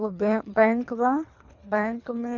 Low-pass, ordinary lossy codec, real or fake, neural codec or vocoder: 7.2 kHz; none; fake; codec, 24 kHz, 3 kbps, HILCodec